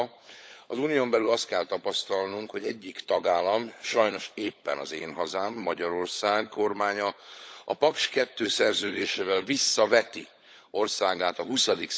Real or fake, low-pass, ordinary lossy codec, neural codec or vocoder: fake; none; none; codec, 16 kHz, 16 kbps, FunCodec, trained on LibriTTS, 50 frames a second